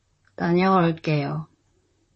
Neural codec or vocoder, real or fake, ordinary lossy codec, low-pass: vocoder, 44.1 kHz, 128 mel bands, Pupu-Vocoder; fake; MP3, 32 kbps; 10.8 kHz